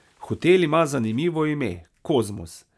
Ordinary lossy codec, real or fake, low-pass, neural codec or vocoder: none; real; none; none